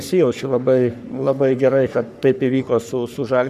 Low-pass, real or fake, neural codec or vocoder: 14.4 kHz; fake; codec, 44.1 kHz, 3.4 kbps, Pupu-Codec